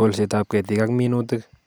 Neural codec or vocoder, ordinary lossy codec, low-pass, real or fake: none; none; none; real